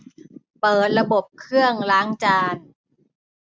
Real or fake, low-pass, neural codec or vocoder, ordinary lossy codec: real; none; none; none